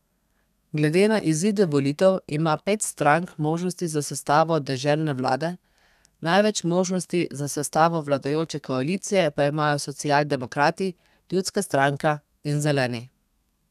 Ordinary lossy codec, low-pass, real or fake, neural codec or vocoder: none; 14.4 kHz; fake; codec, 32 kHz, 1.9 kbps, SNAC